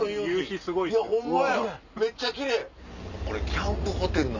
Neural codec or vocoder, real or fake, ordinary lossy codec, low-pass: none; real; none; 7.2 kHz